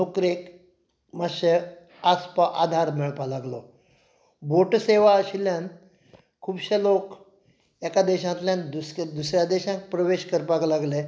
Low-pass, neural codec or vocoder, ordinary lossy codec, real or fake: none; none; none; real